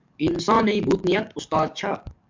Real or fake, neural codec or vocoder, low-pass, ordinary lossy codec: fake; codec, 44.1 kHz, 7.8 kbps, DAC; 7.2 kHz; MP3, 64 kbps